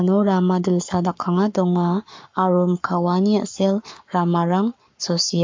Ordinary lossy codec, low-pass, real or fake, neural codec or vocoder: MP3, 48 kbps; 7.2 kHz; fake; codec, 44.1 kHz, 7.8 kbps, Pupu-Codec